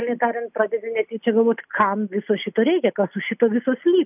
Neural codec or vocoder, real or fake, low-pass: none; real; 3.6 kHz